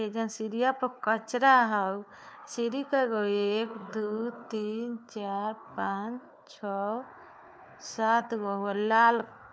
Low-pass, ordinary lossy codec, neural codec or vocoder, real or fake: none; none; codec, 16 kHz, 4 kbps, FunCodec, trained on Chinese and English, 50 frames a second; fake